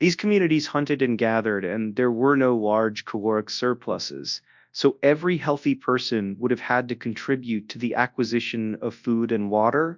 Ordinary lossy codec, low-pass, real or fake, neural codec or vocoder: MP3, 64 kbps; 7.2 kHz; fake; codec, 24 kHz, 0.9 kbps, WavTokenizer, large speech release